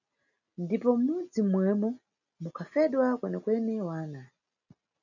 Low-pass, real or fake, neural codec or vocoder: 7.2 kHz; real; none